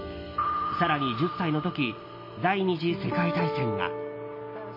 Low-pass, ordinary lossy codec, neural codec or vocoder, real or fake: 5.4 kHz; MP3, 24 kbps; none; real